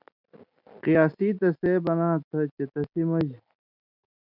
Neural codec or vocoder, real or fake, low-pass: none; real; 5.4 kHz